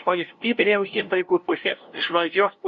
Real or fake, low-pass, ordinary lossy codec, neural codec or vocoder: fake; 7.2 kHz; Opus, 64 kbps; codec, 16 kHz, 0.5 kbps, FunCodec, trained on LibriTTS, 25 frames a second